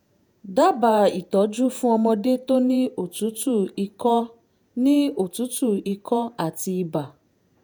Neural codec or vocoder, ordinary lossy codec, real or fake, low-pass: vocoder, 48 kHz, 128 mel bands, Vocos; none; fake; none